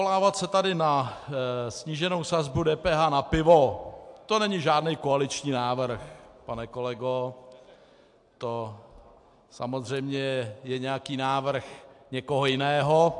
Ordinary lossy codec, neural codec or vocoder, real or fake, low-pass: AAC, 64 kbps; none; real; 10.8 kHz